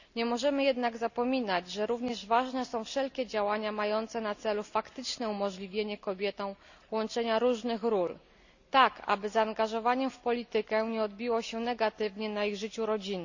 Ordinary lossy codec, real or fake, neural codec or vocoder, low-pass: none; real; none; 7.2 kHz